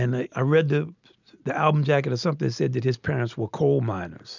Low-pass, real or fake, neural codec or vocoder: 7.2 kHz; real; none